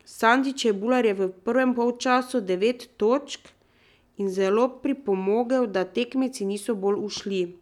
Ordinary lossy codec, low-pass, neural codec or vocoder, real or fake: none; 19.8 kHz; none; real